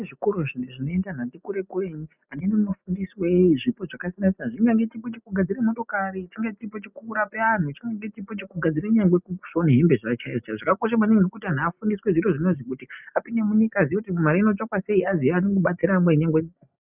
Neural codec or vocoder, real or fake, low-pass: none; real; 3.6 kHz